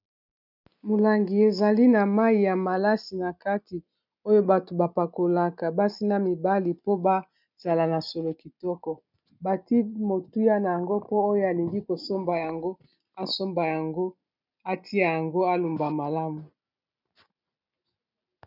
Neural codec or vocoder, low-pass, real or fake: none; 5.4 kHz; real